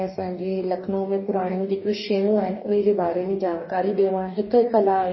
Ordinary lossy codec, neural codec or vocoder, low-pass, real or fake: MP3, 24 kbps; codec, 44.1 kHz, 2.6 kbps, DAC; 7.2 kHz; fake